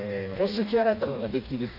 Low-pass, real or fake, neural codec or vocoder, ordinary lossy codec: 5.4 kHz; fake; codec, 16 kHz, 2 kbps, FreqCodec, smaller model; Opus, 64 kbps